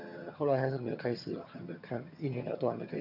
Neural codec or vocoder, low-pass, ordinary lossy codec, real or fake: vocoder, 22.05 kHz, 80 mel bands, HiFi-GAN; 5.4 kHz; none; fake